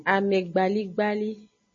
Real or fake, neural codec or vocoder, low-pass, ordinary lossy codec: real; none; 7.2 kHz; MP3, 32 kbps